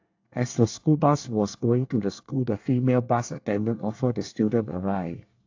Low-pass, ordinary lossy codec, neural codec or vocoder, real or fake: 7.2 kHz; AAC, 48 kbps; codec, 24 kHz, 1 kbps, SNAC; fake